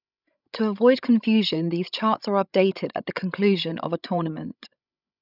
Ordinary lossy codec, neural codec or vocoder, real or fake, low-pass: none; codec, 16 kHz, 16 kbps, FreqCodec, larger model; fake; 5.4 kHz